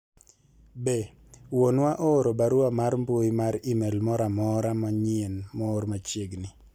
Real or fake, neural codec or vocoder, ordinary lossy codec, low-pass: real; none; none; 19.8 kHz